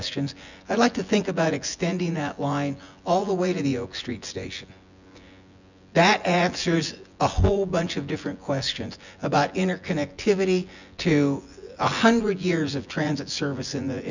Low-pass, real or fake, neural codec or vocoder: 7.2 kHz; fake; vocoder, 24 kHz, 100 mel bands, Vocos